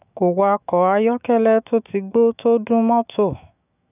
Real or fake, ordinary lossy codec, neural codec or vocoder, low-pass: fake; none; autoencoder, 48 kHz, 128 numbers a frame, DAC-VAE, trained on Japanese speech; 3.6 kHz